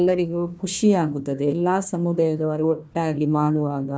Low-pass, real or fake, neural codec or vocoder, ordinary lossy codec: none; fake; codec, 16 kHz, 1 kbps, FunCodec, trained on Chinese and English, 50 frames a second; none